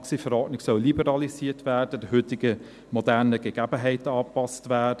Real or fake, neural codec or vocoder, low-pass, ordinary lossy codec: real; none; none; none